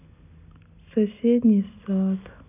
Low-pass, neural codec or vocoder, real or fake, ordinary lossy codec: 3.6 kHz; none; real; none